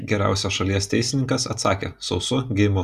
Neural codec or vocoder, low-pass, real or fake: none; 14.4 kHz; real